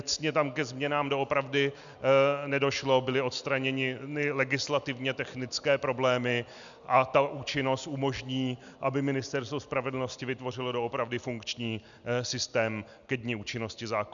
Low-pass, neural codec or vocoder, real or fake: 7.2 kHz; none; real